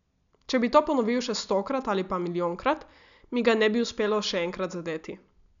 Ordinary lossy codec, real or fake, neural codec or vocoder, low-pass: none; real; none; 7.2 kHz